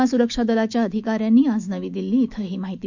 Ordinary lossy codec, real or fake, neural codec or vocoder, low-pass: none; fake; autoencoder, 48 kHz, 128 numbers a frame, DAC-VAE, trained on Japanese speech; 7.2 kHz